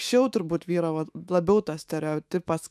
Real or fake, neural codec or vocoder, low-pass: fake; autoencoder, 48 kHz, 128 numbers a frame, DAC-VAE, trained on Japanese speech; 14.4 kHz